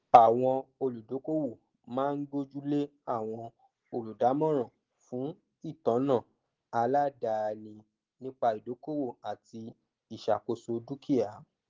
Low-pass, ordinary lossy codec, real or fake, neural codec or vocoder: 7.2 kHz; Opus, 16 kbps; real; none